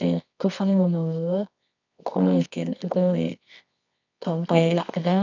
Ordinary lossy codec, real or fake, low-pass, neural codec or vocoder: none; fake; 7.2 kHz; codec, 24 kHz, 0.9 kbps, WavTokenizer, medium music audio release